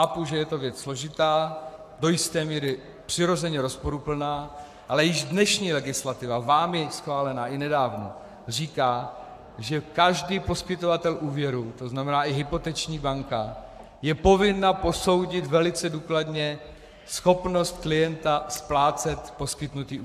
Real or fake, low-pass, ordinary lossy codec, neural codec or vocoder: fake; 14.4 kHz; AAC, 96 kbps; codec, 44.1 kHz, 7.8 kbps, Pupu-Codec